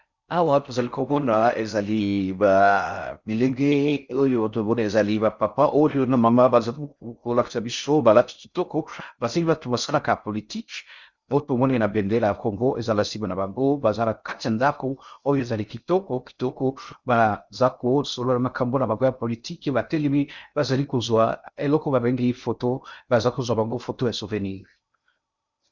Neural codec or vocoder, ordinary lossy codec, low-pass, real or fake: codec, 16 kHz in and 24 kHz out, 0.6 kbps, FocalCodec, streaming, 4096 codes; Opus, 64 kbps; 7.2 kHz; fake